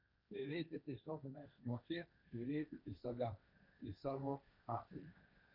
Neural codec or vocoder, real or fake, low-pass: codec, 16 kHz, 1.1 kbps, Voila-Tokenizer; fake; 5.4 kHz